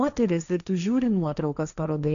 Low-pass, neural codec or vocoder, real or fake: 7.2 kHz; codec, 16 kHz, 1.1 kbps, Voila-Tokenizer; fake